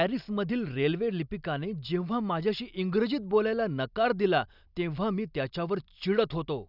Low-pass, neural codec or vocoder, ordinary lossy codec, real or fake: 5.4 kHz; none; none; real